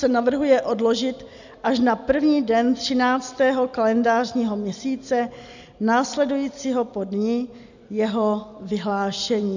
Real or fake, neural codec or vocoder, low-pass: real; none; 7.2 kHz